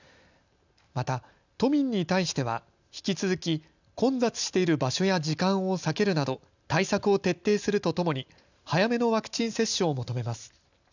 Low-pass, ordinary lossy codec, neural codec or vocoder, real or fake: 7.2 kHz; none; none; real